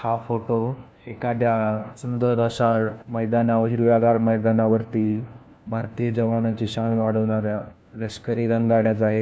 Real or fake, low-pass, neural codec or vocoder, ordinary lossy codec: fake; none; codec, 16 kHz, 1 kbps, FunCodec, trained on LibriTTS, 50 frames a second; none